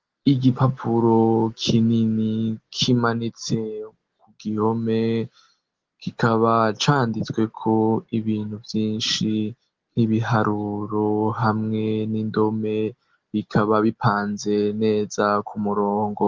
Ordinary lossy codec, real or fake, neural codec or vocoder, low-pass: Opus, 16 kbps; real; none; 7.2 kHz